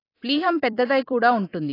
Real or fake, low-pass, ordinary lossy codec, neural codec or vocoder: fake; 5.4 kHz; AAC, 24 kbps; vocoder, 44.1 kHz, 128 mel bands every 256 samples, BigVGAN v2